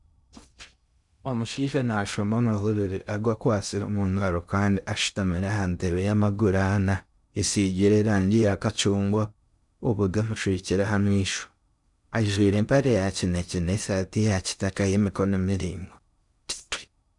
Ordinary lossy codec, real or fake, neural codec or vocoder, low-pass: none; fake; codec, 16 kHz in and 24 kHz out, 0.6 kbps, FocalCodec, streaming, 2048 codes; 10.8 kHz